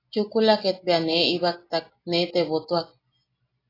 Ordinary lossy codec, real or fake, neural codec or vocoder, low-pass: AAC, 24 kbps; real; none; 5.4 kHz